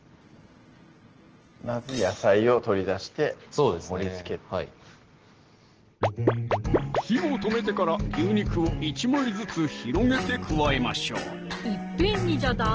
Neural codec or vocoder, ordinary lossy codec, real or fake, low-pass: none; Opus, 16 kbps; real; 7.2 kHz